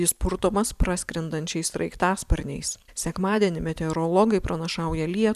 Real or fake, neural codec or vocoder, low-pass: fake; vocoder, 44.1 kHz, 128 mel bands every 256 samples, BigVGAN v2; 14.4 kHz